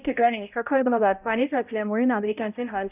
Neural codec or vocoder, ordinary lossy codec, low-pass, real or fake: codec, 16 kHz, 0.5 kbps, X-Codec, HuBERT features, trained on balanced general audio; none; 3.6 kHz; fake